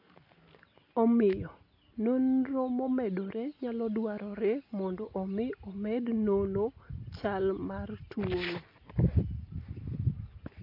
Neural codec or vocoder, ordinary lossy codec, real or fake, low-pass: none; AAC, 32 kbps; real; 5.4 kHz